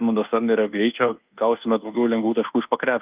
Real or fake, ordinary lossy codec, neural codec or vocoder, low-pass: fake; Opus, 32 kbps; codec, 24 kHz, 1.2 kbps, DualCodec; 3.6 kHz